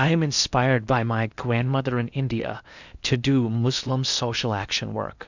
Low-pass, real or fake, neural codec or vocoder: 7.2 kHz; fake; codec, 16 kHz in and 24 kHz out, 0.8 kbps, FocalCodec, streaming, 65536 codes